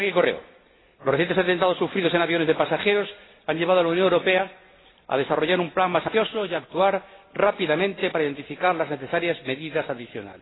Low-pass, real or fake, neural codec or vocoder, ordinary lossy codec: 7.2 kHz; real; none; AAC, 16 kbps